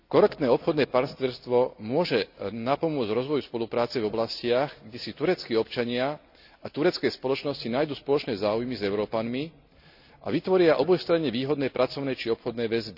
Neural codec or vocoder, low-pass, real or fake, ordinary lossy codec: none; 5.4 kHz; real; none